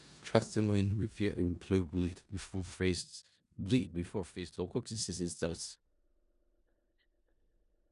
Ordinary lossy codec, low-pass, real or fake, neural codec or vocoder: none; 10.8 kHz; fake; codec, 16 kHz in and 24 kHz out, 0.4 kbps, LongCat-Audio-Codec, four codebook decoder